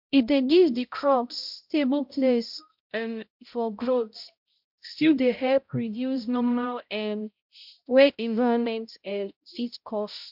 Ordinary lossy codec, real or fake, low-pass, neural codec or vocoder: MP3, 48 kbps; fake; 5.4 kHz; codec, 16 kHz, 0.5 kbps, X-Codec, HuBERT features, trained on balanced general audio